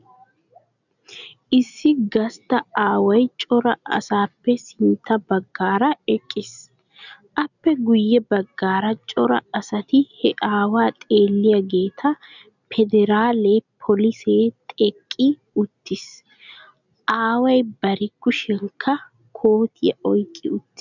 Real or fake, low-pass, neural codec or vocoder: real; 7.2 kHz; none